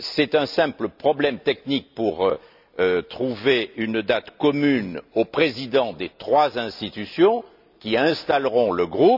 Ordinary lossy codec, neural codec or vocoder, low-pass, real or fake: none; none; 5.4 kHz; real